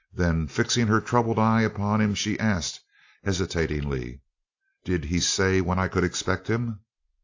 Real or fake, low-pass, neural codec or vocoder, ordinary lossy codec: real; 7.2 kHz; none; AAC, 48 kbps